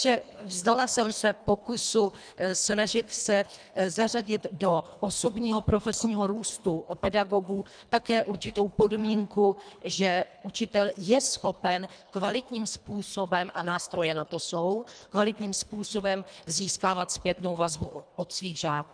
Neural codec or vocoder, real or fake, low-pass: codec, 24 kHz, 1.5 kbps, HILCodec; fake; 9.9 kHz